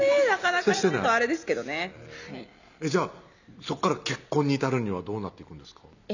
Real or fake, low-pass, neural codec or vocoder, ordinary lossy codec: real; 7.2 kHz; none; none